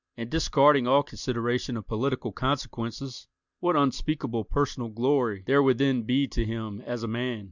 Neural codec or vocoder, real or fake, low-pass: none; real; 7.2 kHz